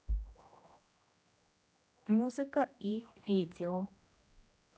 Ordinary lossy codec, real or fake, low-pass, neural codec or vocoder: none; fake; none; codec, 16 kHz, 1 kbps, X-Codec, HuBERT features, trained on general audio